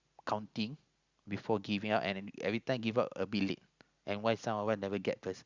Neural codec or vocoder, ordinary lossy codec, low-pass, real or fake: none; Opus, 64 kbps; 7.2 kHz; real